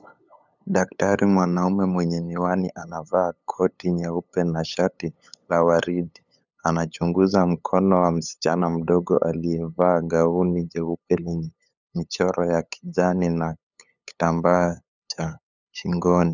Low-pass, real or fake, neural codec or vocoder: 7.2 kHz; fake; codec, 16 kHz, 8 kbps, FunCodec, trained on LibriTTS, 25 frames a second